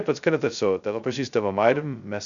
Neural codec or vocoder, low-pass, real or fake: codec, 16 kHz, 0.2 kbps, FocalCodec; 7.2 kHz; fake